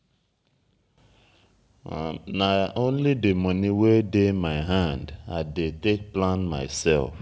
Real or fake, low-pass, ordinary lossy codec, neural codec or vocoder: real; none; none; none